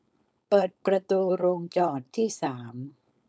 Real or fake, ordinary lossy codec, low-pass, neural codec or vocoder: fake; none; none; codec, 16 kHz, 4.8 kbps, FACodec